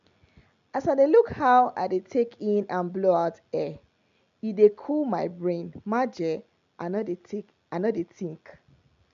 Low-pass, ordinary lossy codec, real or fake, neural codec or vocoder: 7.2 kHz; MP3, 64 kbps; real; none